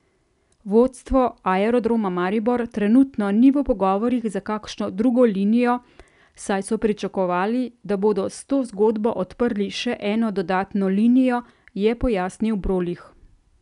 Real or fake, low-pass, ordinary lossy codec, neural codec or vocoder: real; 10.8 kHz; none; none